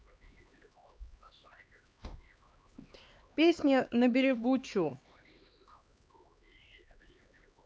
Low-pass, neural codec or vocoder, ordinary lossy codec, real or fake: none; codec, 16 kHz, 2 kbps, X-Codec, HuBERT features, trained on LibriSpeech; none; fake